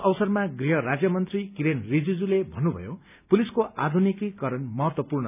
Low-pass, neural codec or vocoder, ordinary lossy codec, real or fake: 3.6 kHz; none; none; real